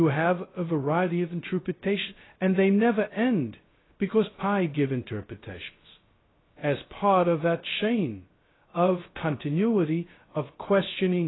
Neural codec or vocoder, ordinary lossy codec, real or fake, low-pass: codec, 16 kHz, 0.2 kbps, FocalCodec; AAC, 16 kbps; fake; 7.2 kHz